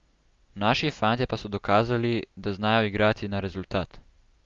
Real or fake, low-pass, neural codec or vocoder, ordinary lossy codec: real; 7.2 kHz; none; Opus, 32 kbps